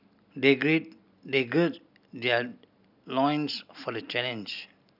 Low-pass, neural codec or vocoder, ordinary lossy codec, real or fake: 5.4 kHz; none; none; real